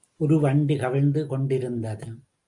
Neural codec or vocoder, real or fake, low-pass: none; real; 10.8 kHz